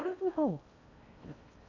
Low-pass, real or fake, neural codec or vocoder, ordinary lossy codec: 7.2 kHz; fake; codec, 16 kHz in and 24 kHz out, 0.6 kbps, FocalCodec, streaming, 4096 codes; none